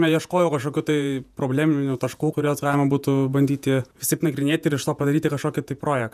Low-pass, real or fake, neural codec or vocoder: 14.4 kHz; fake; vocoder, 48 kHz, 128 mel bands, Vocos